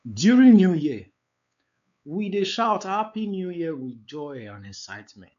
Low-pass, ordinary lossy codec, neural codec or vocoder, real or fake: 7.2 kHz; AAC, 96 kbps; codec, 16 kHz, 4 kbps, X-Codec, WavLM features, trained on Multilingual LibriSpeech; fake